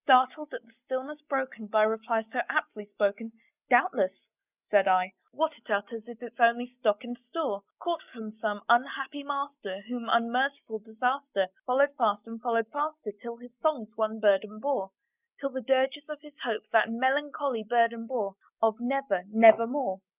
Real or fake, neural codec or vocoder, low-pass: real; none; 3.6 kHz